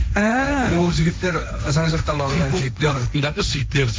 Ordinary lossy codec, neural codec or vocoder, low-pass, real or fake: none; codec, 16 kHz, 1.1 kbps, Voila-Tokenizer; none; fake